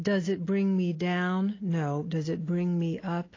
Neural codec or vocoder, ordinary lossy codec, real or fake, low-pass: none; AAC, 32 kbps; real; 7.2 kHz